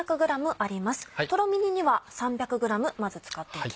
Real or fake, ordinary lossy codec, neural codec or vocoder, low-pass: real; none; none; none